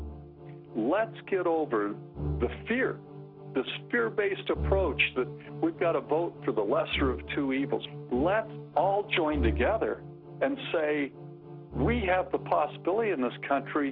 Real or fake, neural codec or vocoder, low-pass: real; none; 5.4 kHz